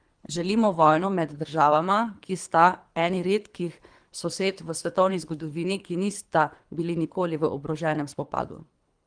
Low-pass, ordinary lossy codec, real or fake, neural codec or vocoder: 9.9 kHz; Opus, 32 kbps; fake; codec, 24 kHz, 3 kbps, HILCodec